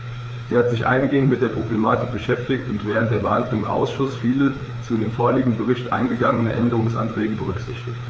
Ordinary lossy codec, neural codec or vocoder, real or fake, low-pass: none; codec, 16 kHz, 4 kbps, FreqCodec, larger model; fake; none